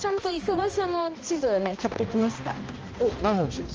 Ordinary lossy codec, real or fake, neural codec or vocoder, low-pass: Opus, 24 kbps; fake; codec, 16 kHz, 1 kbps, X-Codec, HuBERT features, trained on balanced general audio; 7.2 kHz